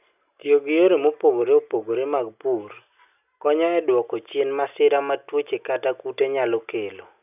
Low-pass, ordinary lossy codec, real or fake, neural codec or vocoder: 3.6 kHz; none; real; none